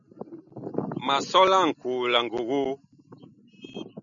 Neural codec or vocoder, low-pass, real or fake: none; 7.2 kHz; real